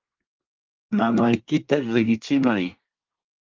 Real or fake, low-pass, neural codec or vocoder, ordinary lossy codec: fake; 7.2 kHz; codec, 24 kHz, 1 kbps, SNAC; Opus, 32 kbps